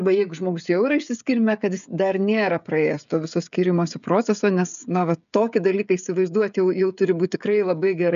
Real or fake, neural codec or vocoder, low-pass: fake; codec, 16 kHz, 16 kbps, FreqCodec, smaller model; 7.2 kHz